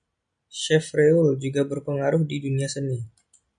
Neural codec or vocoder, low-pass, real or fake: none; 9.9 kHz; real